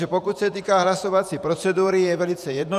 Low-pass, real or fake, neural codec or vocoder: 14.4 kHz; fake; vocoder, 48 kHz, 128 mel bands, Vocos